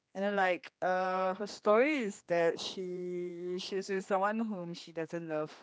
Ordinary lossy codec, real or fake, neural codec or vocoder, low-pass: none; fake; codec, 16 kHz, 2 kbps, X-Codec, HuBERT features, trained on general audio; none